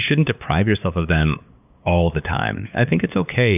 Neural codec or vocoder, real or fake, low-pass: codec, 16 kHz, 8 kbps, FunCodec, trained on LibriTTS, 25 frames a second; fake; 3.6 kHz